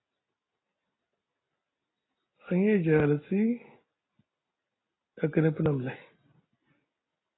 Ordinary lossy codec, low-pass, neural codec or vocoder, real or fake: AAC, 16 kbps; 7.2 kHz; none; real